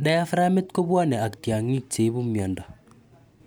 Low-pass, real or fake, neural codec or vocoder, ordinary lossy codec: none; real; none; none